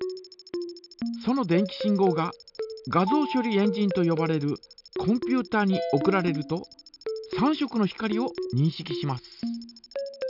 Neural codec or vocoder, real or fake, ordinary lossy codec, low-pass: none; real; none; 5.4 kHz